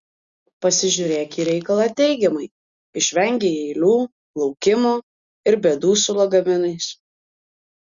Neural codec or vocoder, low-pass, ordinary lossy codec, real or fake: none; 7.2 kHz; Opus, 64 kbps; real